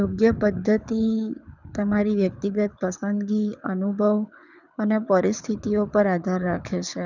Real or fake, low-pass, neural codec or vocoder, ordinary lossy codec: fake; 7.2 kHz; codec, 24 kHz, 6 kbps, HILCodec; none